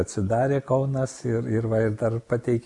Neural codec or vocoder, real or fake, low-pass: none; real; 10.8 kHz